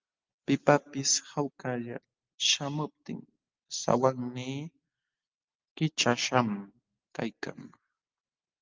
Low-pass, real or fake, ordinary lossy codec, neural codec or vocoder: 7.2 kHz; real; Opus, 32 kbps; none